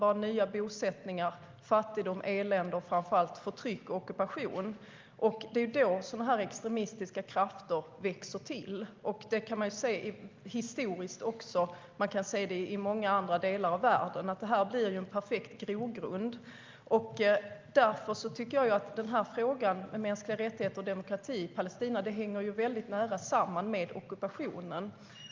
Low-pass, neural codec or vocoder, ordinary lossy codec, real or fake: 7.2 kHz; none; Opus, 24 kbps; real